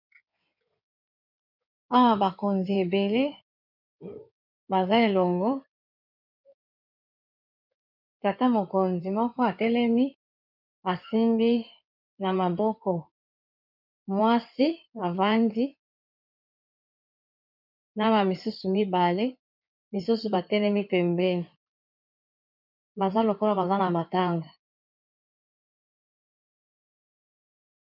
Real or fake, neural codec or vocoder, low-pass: fake; codec, 16 kHz in and 24 kHz out, 2.2 kbps, FireRedTTS-2 codec; 5.4 kHz